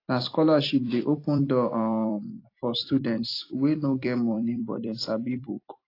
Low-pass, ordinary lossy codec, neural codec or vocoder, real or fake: 5.4 kHz; AAC, 32 kbps; vocoder, 44.1 kHz, 128 mel bands every 256 samples, BigVGAN v2; fake